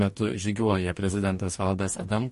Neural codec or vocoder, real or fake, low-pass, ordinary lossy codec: codec, 44.1 kHz, 2.6 kbps, DAC; fake; 14.4 kHz; MP3, 48 kbps